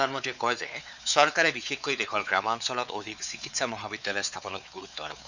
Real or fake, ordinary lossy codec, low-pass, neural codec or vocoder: fake; none; 7.2 kHz; codec, 16 kHz, 4 kbps, X-Codec, WavLM features, trained on Multilingual LibriSpeech